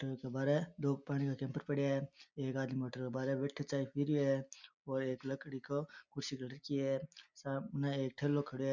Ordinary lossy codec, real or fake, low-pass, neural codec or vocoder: none; real; 7.2 kHz; none